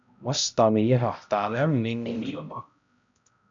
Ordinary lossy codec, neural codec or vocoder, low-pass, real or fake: AAC, 64 kbps; codec, 16 kHz, 0.5 kbps, X-Codec, HuBERT features, trained on balanced general audio; 7.2 kHz; fake